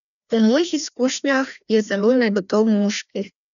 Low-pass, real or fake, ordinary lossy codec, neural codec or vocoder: 7.2 kHz; fake; none; codec, 16 kHz, 1 kbps, FreqCodec, larger model